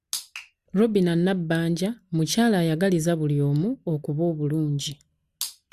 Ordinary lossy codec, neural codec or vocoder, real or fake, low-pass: Opus, 64 kbps; none; real; 14.4 kHz